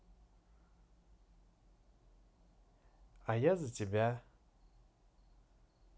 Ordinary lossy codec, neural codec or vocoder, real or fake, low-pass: none; none; real; none